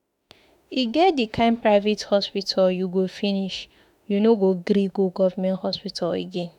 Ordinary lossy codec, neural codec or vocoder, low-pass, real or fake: none; autoencoder, 48 kHz, 32 numbers a frame, DAC-VAE, trained on Japanese speech; 19.8 kHz; fake